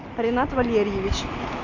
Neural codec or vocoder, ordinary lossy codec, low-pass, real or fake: none; AAC, 48 kbps; 7.2 kHz; real